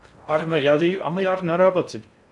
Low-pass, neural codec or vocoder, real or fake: 10.8 kHz; codec, 16 kHz in and 24 kHz out, 0.6 kbps, FocalCodec, streaming, 4096 codes; fake